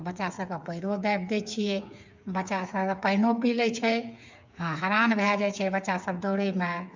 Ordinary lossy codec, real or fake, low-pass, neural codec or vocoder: MP3, 64 kbps; fake; 7.2 kHz; codec, 16 kHz, 8 kbps, FreqCodec, smaller model